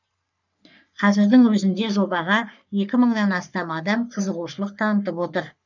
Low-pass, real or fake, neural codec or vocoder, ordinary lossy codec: 7.2 kHz; fake; codec, 44.1 kHz, 3.4 kbps, Pupu-Codec; none